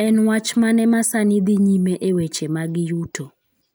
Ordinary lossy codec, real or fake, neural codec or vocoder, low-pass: none; real; none; none